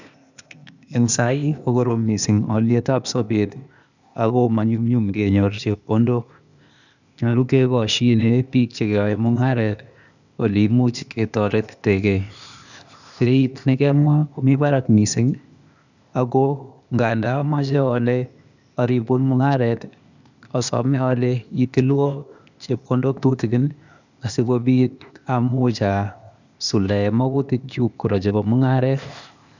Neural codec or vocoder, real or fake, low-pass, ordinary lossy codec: codec, 16 kHz, 0.8 kbps, ZipCodec; fake; 7.2 kHz; none